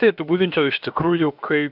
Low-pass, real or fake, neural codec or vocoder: 5.4 kHz; fake; codec, 16 kHz, about 1 kbps, DyCAST, with the encoder's durations